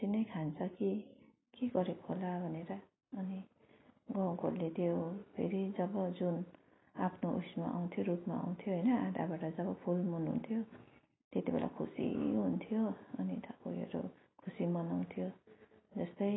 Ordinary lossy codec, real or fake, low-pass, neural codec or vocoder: AAC, 16 kbps; real; 7.2 kHz; none